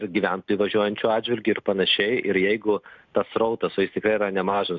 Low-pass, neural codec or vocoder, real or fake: 7.2 kHz; none; real